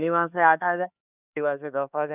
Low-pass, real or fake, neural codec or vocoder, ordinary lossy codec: 3.6 kHz; fake; codec, 16 kHz, 2 kbps, X-Codec, HuBERT features, trained on LibriSpeech; none